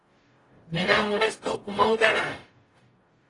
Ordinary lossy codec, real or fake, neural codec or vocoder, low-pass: AAC, 32 kbps; fake; codec, 44.1 kHz, 0.9 kbps, DAC; 10.8 kHz